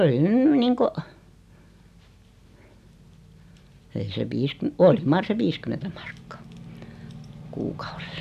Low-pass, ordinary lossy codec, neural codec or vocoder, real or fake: 14.4 kHz; none; none; real